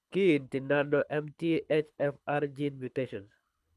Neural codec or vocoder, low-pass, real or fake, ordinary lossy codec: codec, 24 kHz, 6 kbps, HILCodec; none; fake; none